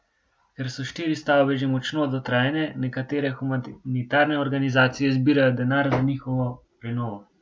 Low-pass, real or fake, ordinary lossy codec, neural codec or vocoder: none; real; none; none